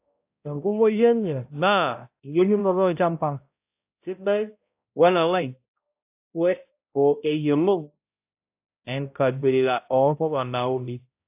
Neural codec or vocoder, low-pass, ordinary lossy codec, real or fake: codec, 16 kHz, 0.5 kbps, X-Codec, HuBERT features, trained on balanced general audio; 3.6 kHz; AAC, 32 kbps; fake